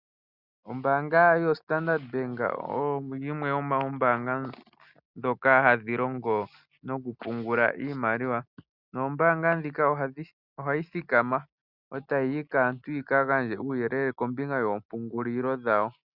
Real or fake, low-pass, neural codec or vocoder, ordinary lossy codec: real; 5.4 kHz; none; Opus, 64 kbps